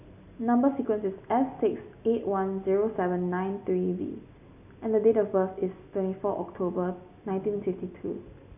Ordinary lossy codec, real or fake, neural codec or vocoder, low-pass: none; real; none; 3.6 kHz